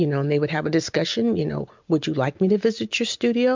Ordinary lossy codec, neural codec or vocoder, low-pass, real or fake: MP3, 64 kbps; none; 7.2 kHz; real